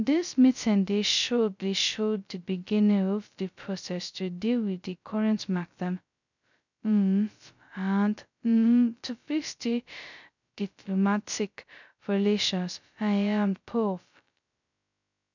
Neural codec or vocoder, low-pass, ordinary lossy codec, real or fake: codec, 16 kHz, 0.2 kbps, FocalCodec; 7.2 kHz; none; fake